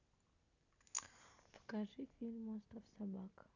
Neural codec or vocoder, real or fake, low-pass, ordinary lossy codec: none; real; 7.2 kHz; none